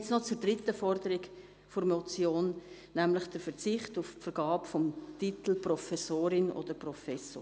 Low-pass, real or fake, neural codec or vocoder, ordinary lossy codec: none; real; none; none